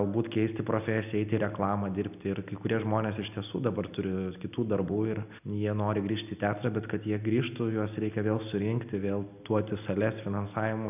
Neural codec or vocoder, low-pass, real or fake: none; 3.6 kHz; real